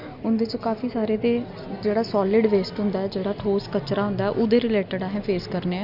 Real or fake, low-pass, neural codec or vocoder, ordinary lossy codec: real; 5.4 kHz; none; none